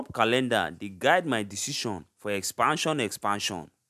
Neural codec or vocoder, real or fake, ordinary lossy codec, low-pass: none; real; none; 14.4 kHz